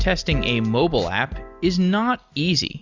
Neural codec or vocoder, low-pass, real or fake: none; 7.2 kHz; real